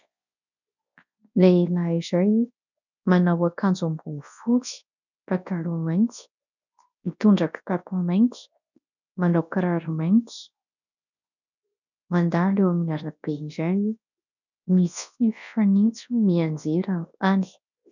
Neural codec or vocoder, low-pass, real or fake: codec, 24 kHz, 0.9 kbps, WavTokenizer, large speech release; 7.2 kHz; fake